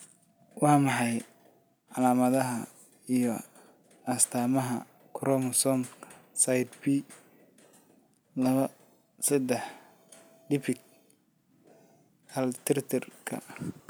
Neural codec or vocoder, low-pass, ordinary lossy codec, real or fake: none; none; none; real